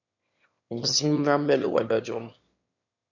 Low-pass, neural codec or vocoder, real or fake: 7.2 kHz; autoencoder, 22.05 kHz, a latent of 192 numbers a frame, VITS, trained on one speaker; fake